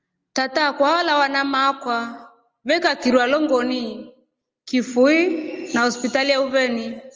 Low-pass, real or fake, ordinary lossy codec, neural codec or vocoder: 7.2 kHz; real; Opus, 24 kbps; none